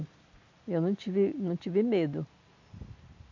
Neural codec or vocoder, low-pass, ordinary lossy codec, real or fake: none; 7.2 kHz; MP3, 64 kbps; real